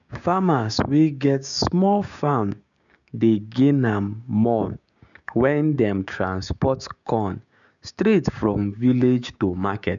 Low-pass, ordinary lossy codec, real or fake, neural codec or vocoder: 7.2 kHz; none; fake; codec, 16 kHz, 6 kbps, DAC